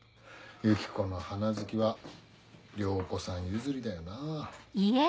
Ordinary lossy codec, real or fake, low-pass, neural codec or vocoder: none; real; none; none